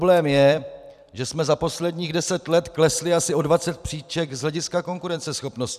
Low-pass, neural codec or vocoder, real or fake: 14.4 kHz; vocoder, 44.1 kHz, 128 mel bands every 256 samples, BigVGAN v2; fake